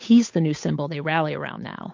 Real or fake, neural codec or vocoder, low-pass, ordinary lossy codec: fake; codec, 16 kHz, 8 kbps, FunCodec, trained on Chinese and English, 25 frames a second; 7.2 kHz; MP3, 48 kbps